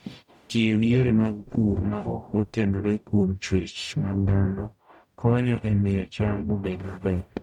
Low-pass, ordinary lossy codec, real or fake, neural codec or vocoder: 19.8 kHz; none; fake; codec, 44.1 kHz, 0.9 kbps, DAC